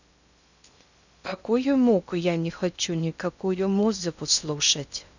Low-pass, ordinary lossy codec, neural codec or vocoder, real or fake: 7.2 kHz; none; codec, 16 kHz in and 24 kHz out, 0.6 kbps, FocalCodec, streaming, 2048 codes; fake